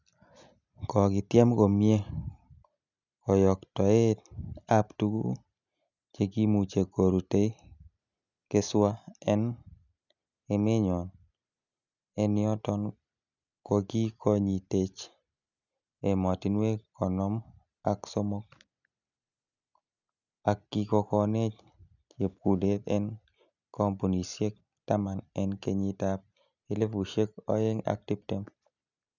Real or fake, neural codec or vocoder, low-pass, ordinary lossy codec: real; none; 7.2 kHz; none